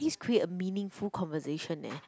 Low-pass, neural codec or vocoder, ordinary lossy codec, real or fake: none; none; none; real